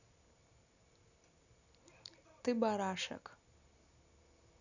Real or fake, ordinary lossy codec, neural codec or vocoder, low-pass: real; none; none; 7.2 kHz